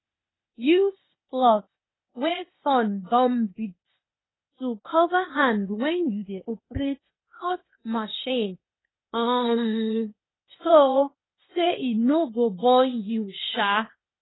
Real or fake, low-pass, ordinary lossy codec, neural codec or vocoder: fake; 7.2 kHz; AAC, 16 kbps; codec, 16 kHz, 0.8 kbps, ZipCodec